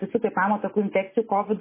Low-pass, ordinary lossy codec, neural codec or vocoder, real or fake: 3.6 kHz; MP3, 16 kbps; none; real